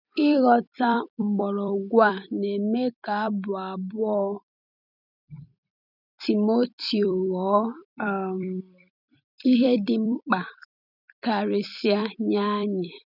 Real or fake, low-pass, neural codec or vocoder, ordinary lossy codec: fake; 5.4 kHz; vocoder, 44.1 kHz, 128 mel bands every 256 samples, BigVGAN v2; none